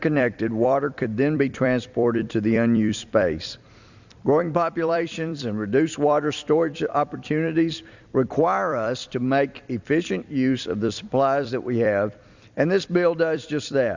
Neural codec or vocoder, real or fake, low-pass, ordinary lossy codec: vocoder, 44.1 kHz, 128 mel bands every 256 samples, BigVGAN v2; fake; 7.2 kHz; Opus, 64 kbps